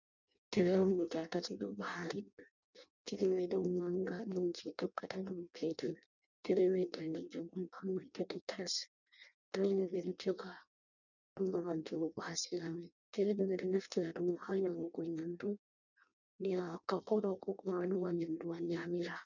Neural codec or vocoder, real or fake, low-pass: codec, 16 kHz in and 24 kHz out, 0.6 kbps, FireRedTTS-2 codec; fake; 7.2 kHz